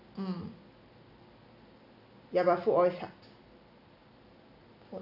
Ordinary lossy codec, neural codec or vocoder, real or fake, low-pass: none; none; real; 5.4 kHz